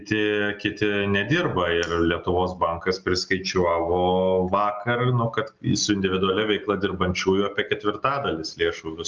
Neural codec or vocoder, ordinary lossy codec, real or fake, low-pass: none; Opus, 24 kbps; real; 7.2 kHz